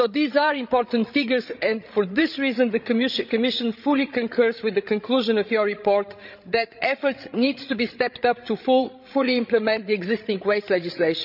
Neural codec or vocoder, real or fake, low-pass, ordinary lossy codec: codec, 16 kHz, 16 kbps, FreqCodec, larger model; fake; 5.4 kHz; none